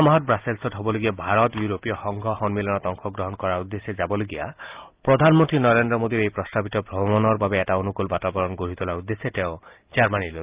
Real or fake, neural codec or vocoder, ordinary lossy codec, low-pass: real; none; Opus, 32 kbps; 3.6 kHz